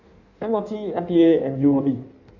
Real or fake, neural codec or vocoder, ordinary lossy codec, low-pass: fake; codec, 16 kHz in and 24 kHz out, 1.1 kbps, FireRedTTS-2 codec; none; 7.2 kHz